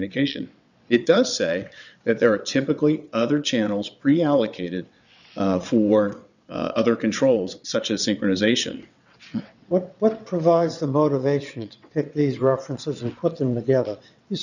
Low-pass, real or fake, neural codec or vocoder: 7.2 kHz; fake; vocoder, 22.05 kHz, 80 mel bands, WaveNeXt